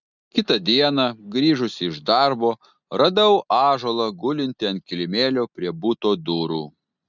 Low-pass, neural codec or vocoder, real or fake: 7.2 kHz; none; real